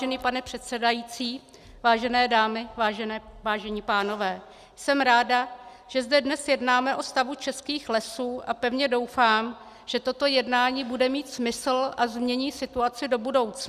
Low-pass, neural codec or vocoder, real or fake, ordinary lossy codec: 14.4 kHz; none; real; Opus, 64 kbps